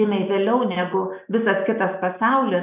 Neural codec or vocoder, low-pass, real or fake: none; 3.6 kHz; real